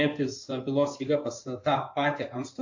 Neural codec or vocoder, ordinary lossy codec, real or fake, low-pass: codec, 16 kHz in and 24 kHz out, 2.2 kbps, FireRedTTS-2 codec; AAC, 48 kbps; fake; 7.2 kHz